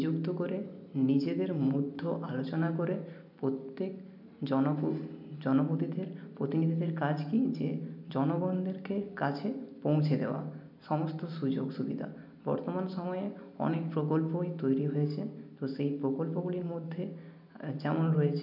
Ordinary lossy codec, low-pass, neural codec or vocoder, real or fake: none; 5.4 kHz; none; real